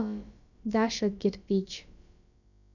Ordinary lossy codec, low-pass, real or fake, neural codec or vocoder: none; 7.2 kHz; fake; codec, 16 kHz, about 1 kbps, DyCAST, with the encoder's durations